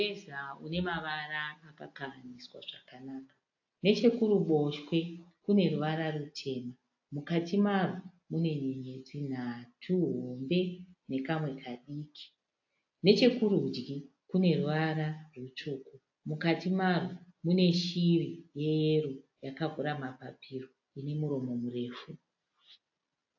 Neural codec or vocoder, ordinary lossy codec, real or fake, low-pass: none; AAC, 48 kbps; real; 7.2 kHz